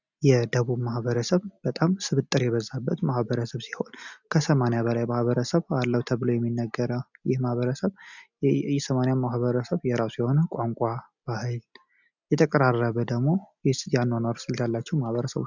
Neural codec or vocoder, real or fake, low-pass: none; real; 7.2 kHz